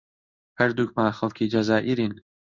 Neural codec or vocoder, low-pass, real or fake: codec, 16 kHz in and 24 kHz out, 1 kbps, XY-Tokenizer; 7.2 kHz; fake